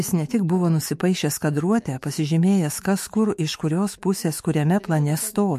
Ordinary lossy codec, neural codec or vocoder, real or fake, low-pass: MP3, 64 kbps; autoencoder, 48 kHz, 128 numbers a frame, DAC-VAE, trained on Japanese speech; fake; 14.4 kHz